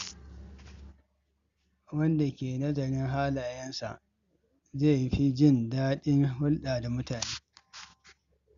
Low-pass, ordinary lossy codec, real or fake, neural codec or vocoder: 7.2 kHz; Opus, 64 kbps; real; none